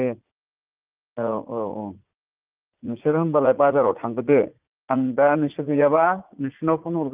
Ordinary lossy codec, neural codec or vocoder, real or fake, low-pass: Opus, 32 kbps; vocoder, 44.1 kHz, 80 mel bands, Vocos; fake; 3.6 kHz